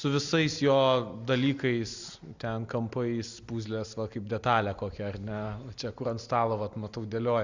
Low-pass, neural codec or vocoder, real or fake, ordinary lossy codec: 7.2 kHz; none; real; Opus, 64 kbps